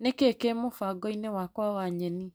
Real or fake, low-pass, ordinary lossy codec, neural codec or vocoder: real; none; none; none